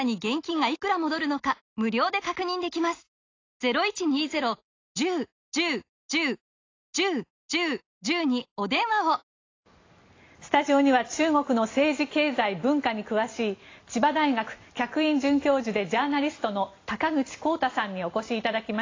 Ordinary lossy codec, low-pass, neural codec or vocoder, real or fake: AAC, 32 kbps; 7.2 kHz; none; real